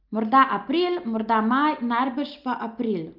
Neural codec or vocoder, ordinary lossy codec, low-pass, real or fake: none; Opus, 24 kbps; 5.4 kHz; real